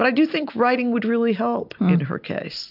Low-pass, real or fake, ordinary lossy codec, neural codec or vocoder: 5.4 kHz; real; AAC, 48 kbps; none